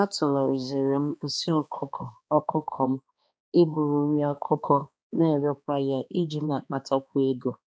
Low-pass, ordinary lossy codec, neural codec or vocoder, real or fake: none; none; codec, 16 kHz, 2 kbps, X-Codec, HuBERT features, trained on balanced general audio; fake